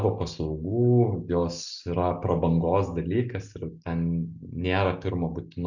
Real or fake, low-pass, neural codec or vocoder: real; 7.2 kHz; none